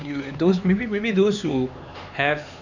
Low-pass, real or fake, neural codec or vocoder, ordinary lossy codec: 7.2 kHz; fake; codec, 16 kHz, 4 kbps, X-Codec, HuBERT features, trained on LibriSpeech; none